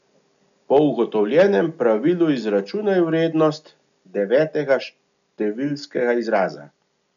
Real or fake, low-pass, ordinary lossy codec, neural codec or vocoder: real; 7.2 kHz; none; none